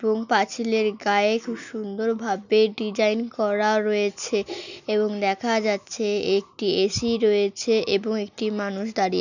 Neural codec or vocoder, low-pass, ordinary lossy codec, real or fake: none; 7.2 kHz; none; real